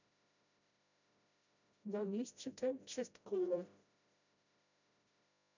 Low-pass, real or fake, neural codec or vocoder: 7.2 kHz; fake; codec, 16 kHz, 0.5 kbps, FreqCodec, smaller model